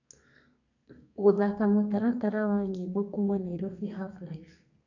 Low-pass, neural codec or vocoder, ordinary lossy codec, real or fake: 7.2 kHz; codec, 32 kHz, 1.9 kbps, SNAC; none; fake